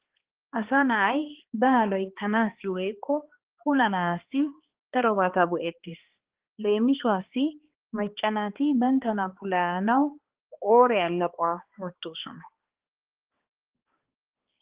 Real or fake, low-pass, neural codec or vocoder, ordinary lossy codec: fake; 3.6 kHz; codec, 16 kHz, 2 kbps, X-Codec, HuBERT features, trained on balanced general audio; Opus, 16 kbps